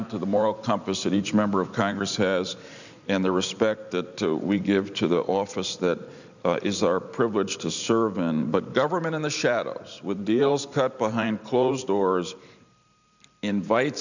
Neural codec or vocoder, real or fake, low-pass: vocoder, 44.1 kHz, 128 mel bands every 512 samples, BigVGAN v2; fake; 7.2 kHz